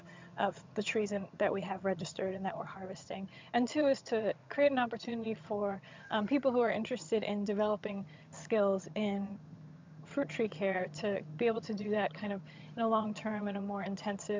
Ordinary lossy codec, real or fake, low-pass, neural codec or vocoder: MP3, 64 kbps; fake; 7.2 kHz; vocoder, 22.05 kHz, 80 mel bands, HiFi-GAN